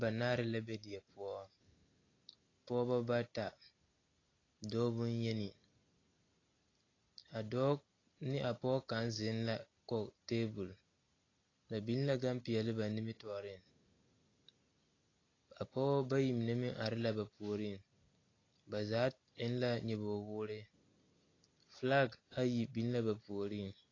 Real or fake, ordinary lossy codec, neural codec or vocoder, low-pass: real; AAC, 32 kbps; none; 7.2 kHz